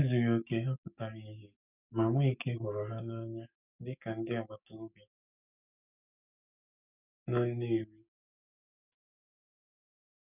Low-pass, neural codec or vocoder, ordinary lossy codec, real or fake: 3.6 kHz; codec, 44.1 kHz, 7.8 kbps, DAC; none; fake